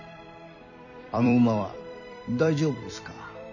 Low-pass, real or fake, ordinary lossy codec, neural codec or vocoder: 7.2 kHz; fake; none; vocoder, 44.1 kHz, 128 mel bands every 256 samples, BigVGAN v2